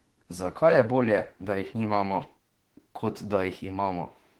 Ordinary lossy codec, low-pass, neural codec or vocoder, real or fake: Opus, 16 kbps; 19.8 kHz; autoencoder, 48 kHz, 32 numbers a frame, DAC-VAE, trained on Japanese speech; fake